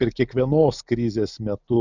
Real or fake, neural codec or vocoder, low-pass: real; none; 7.2 kHz